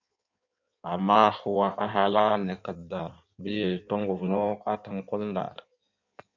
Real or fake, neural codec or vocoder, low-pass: fake; codec, 16 kHz in and 24 kHz out, 1.1 kbps, FireRedTTS-2 codec; 7.2 kHz